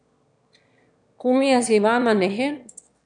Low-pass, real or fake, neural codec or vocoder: 9.9 kHz; fake; autoencoder, 22.05 kHz, a latent of 192 numbers a frame, VITS, trained on one speaker